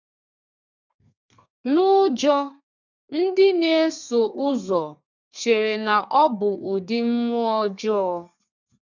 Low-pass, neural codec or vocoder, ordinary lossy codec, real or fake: 7.2 kHz; codec, 32 kHz, 1.9 kbps, SNAC; none; fake